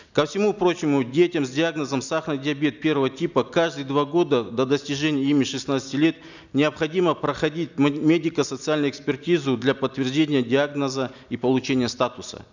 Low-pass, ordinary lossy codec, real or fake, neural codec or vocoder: 7.2 kHz; none; real; none